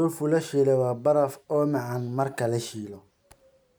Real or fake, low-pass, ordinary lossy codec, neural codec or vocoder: real; none; none; none